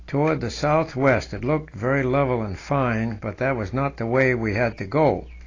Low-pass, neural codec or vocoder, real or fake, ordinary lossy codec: 7.2 kHz; none; real; AAC, 32 kbps